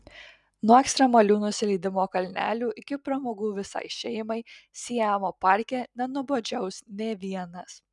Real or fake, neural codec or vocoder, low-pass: real; none; 10.8 kHz